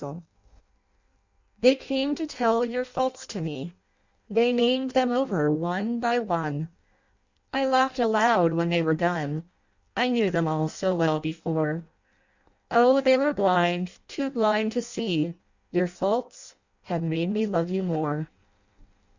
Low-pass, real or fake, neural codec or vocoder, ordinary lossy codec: 7.2 kHz; fake; codec, 16 kHz in and 24 kHz out, 0.6 kbps, FireRedTTS-2 codec; Opus, 64 kbps